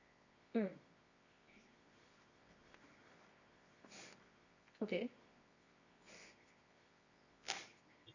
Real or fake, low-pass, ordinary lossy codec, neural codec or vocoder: fake; 7.2 kHz; none; codec, 24 kHz, 0.9 kbps, WavTokenizer, medium music audio release